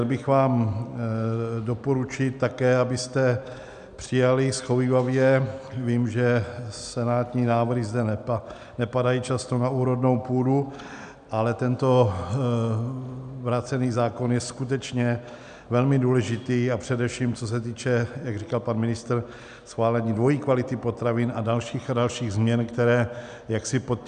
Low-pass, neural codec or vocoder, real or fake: 9.9 kHz; none; real